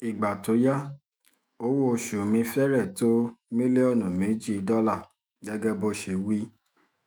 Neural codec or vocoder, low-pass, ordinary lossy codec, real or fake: autoencoder, 48 kHz, 128 numbers a frame, DAC-VAE, trained on Japanese speech; none; none; fake